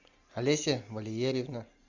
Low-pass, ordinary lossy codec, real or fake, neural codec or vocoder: 7.2 kHz; Opus, 64 kbps; real; none